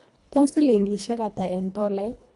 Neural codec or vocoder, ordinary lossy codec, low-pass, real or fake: codec, 24 kHz, 1.5 kbps, HILCodec; none; 10.8 kHz; fake